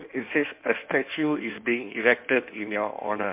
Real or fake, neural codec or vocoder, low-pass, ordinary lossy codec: fake; codec, 16 kHz in and 24 kHz out, 1.1 kbps, FireRedTTS-2 codec; 3.6 kHz; MP3, 32 kbps